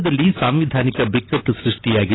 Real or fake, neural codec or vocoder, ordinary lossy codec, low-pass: real; none; AAC, 16 kbps; 7.2 kHz